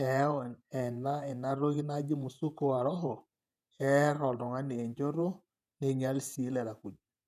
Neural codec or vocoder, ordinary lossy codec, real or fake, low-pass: vocoder, 48 kHz, 128 mel bands, Vocos; none; fake; 14.4 kHz